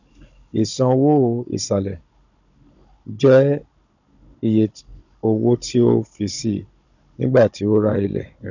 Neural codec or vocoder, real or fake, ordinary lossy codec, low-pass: codec, 16 kHz, 16 kbps, FunCodec, trained on Chinese and English, 50 frames a second; fake; none; 7.2 kHz